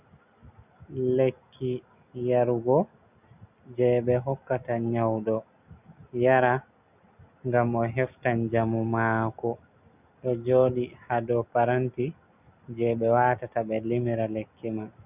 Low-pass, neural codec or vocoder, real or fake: 3.6 kHz; none; real